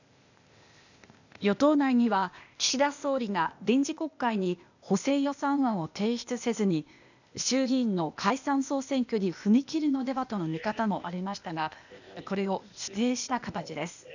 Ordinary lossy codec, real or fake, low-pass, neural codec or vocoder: none; fake; 7.2 kHz; codec, 16 kHz, 0.8 kbps, ZipCodec